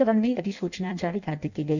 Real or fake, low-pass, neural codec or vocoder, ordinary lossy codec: fake; 7.2 kHz; codec, 16 kHz in and 24 kHz out, 0.6 kbps, FireRedTTS-2 codec; none